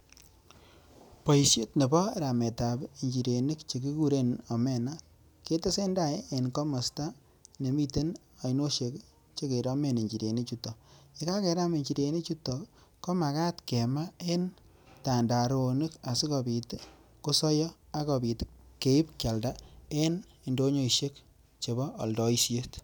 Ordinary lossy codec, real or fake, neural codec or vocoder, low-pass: none; real; none; none